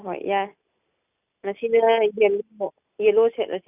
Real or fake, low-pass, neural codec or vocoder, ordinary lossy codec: real; 3.6 kHz; none; none